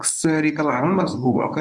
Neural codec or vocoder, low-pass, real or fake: codec, 24 kHz, 0.9 kbps, WavTokenizer, medium speech release version 1; 10.8 kHz; fake